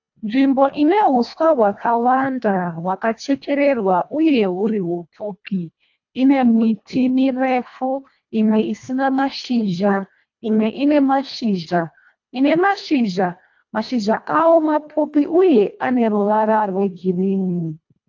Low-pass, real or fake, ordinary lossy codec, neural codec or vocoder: 7.2 kHz; fake; AAC, 48 kbps; codec, 24 kHz, 1.5 kbps, HILCodec